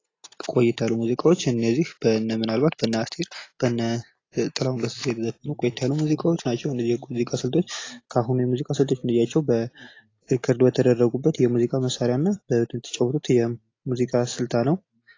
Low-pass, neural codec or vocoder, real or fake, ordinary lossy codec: 7.2 kHz; none; real; AAC, 32 kbps